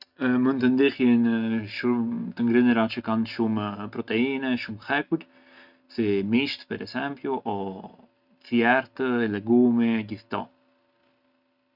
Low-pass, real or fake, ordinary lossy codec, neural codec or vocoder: 5.4 kHz; real; none; none